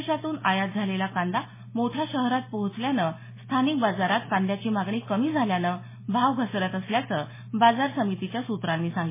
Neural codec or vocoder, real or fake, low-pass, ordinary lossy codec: none; real; 3.6 kHz; MP3, 16 kbps